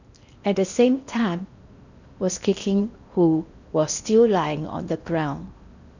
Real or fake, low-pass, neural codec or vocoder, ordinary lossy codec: fake; 7.2 kHz; codec, 16 kHz in and 24 kHz out, 0.8 kbps, FocalCodec, streaming, 65536 codes; none